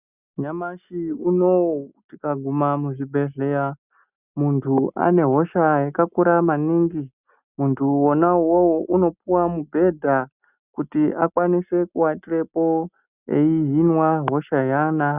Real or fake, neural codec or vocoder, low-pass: real; none; 3.6 kHz